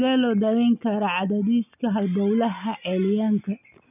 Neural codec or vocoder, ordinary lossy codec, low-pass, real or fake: none; none; 3.6 kHz; real